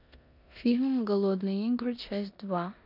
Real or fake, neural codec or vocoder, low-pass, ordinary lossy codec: fake; codec, 16 kHz in and 24 kHz out, 0.9 kbps, LongCat-Audio-Codec, four codebook decoder; 5.4 kHz; none